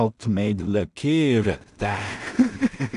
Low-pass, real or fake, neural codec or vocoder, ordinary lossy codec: 10.8 kHz; fake; codec, 16 kHz in and 24 kHz out, 0.4 kbps, LongCat-Audio-Codec, two codebook decoder; none